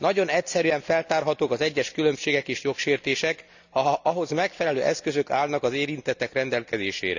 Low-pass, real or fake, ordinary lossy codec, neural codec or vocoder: 7.2 kHz; real; none; none